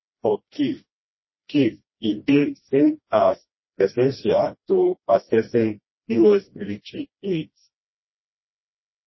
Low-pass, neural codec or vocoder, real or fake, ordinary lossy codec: 7.2 kHz; codec, 16 kHz, 1 kbps, FreqCodec, smaller model; fake; MP3, 24 kbps